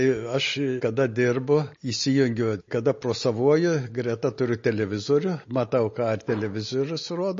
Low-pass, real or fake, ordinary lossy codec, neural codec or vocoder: 7.2 kHz; real; MP3, 32 kbps; none